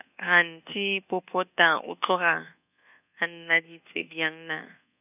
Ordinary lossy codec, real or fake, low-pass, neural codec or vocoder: none; fake; 3.6 kHz; codec, 24 kHz, 1.2 kbps, DualCodec